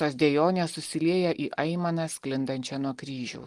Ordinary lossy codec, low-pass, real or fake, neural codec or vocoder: Opus, 24 kbps; 10.8 kHz; real; none